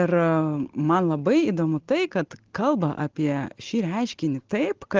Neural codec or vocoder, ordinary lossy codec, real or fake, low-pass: none; Opus, 16 kbps; real; 7.2 kHz